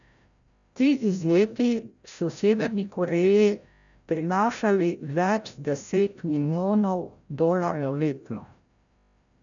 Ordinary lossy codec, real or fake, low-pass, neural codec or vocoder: none; fake; 7.2 kHz; codec, 16 kHz, 0.5 kbps, FreqCodec, larger model